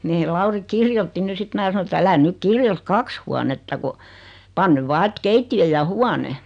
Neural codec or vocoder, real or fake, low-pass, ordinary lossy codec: none; real; 9.9 kHz; none